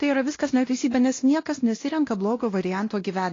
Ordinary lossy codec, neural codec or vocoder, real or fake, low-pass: AAC, 32 kbps; codec, 16 kHz, 1 kbps, X-Codec, WavLM features, trained on Multilingual LibriSpeech; fake; 7.2 kHz